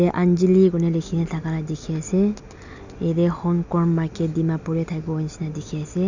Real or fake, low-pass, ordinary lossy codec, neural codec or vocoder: real; 7.2 kHz; none; none